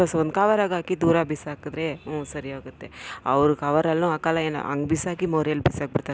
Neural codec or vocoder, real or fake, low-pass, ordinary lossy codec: none; real; none; none